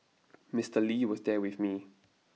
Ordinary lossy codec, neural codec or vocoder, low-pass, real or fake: none; none; none; real